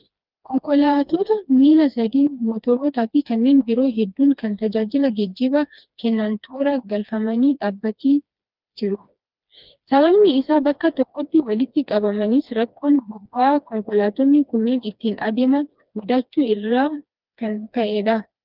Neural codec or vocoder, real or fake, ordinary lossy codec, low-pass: codec, 16 kHz, 2 kbps, FreqCodec, smaller model; fake; Opus, 24 kbps; 5.4 kHz